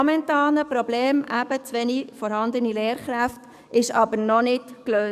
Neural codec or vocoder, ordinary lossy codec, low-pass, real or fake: codec, 44.1 kHz, 7.8 kbps, DAC; none; 14.4 kHz; fake